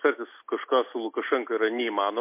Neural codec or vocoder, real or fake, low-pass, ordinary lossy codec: none; real; 3.6 kHz; MP3, 24 kbps